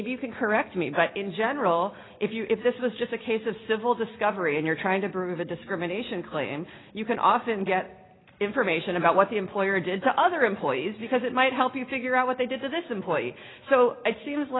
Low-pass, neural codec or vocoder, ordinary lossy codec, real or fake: 7.2 kHz; none; AAC, 16 kbps; real